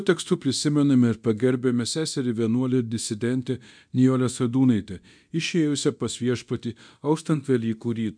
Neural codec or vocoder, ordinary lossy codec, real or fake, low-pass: codec, 24 kHz, 0.9 kbps, DualCodec; MP3, 96 kbps; fake; 9.9 kHz